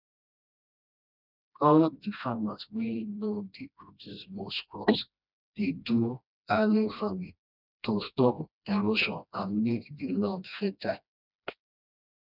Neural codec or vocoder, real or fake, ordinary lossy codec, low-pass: codec, 16 kHz, 1 kbps, FreqCodec, smaller model; fake; none; 5.4 kHz